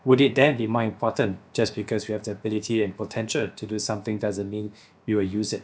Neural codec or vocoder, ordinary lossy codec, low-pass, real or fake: codec, 16 kHz, about 1 kbps, DyCAST, with the encoder's durations; none; none; fake